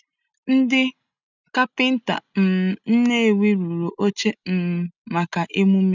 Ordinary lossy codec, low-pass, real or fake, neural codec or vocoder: none; 7.2 kHz; real; none